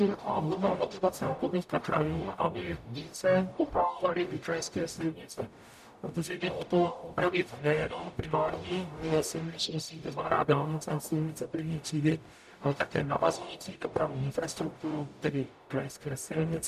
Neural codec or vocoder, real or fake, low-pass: codec, 44.1 kHz, 0.9 kbps, DAC; fake; 14.4 kHz